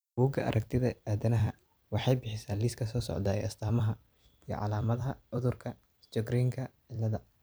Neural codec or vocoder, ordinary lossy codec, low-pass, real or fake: vocoder, 44.1 kHz, 128 mel bands every 256 samples, BigVGAN v2; none; none; fake